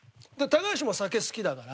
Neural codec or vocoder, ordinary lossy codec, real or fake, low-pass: none; none; real; none